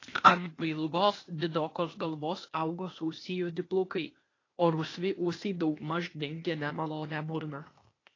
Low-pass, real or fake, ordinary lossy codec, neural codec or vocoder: 7.2 kHz; fake; AAC, 32 kbps; codec, 16 kHz, 0.8 kbps, ZipCodec